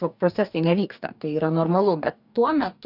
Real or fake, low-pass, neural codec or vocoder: fake; 5.4 kHz; codec, 44.1 kHz, 2.6 kbps, DAC